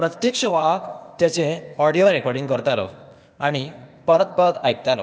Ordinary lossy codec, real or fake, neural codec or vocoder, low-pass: none; fake; codec, 16 kHz, 0.8 kbps, ZipCodec; none